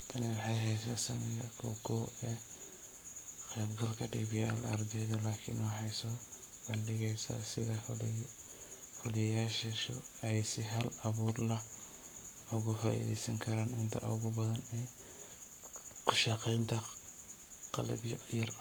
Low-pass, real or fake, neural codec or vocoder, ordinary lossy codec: none; fake; codec, 44.1 kHz, 7.8 kbps, Pupu-Codec; none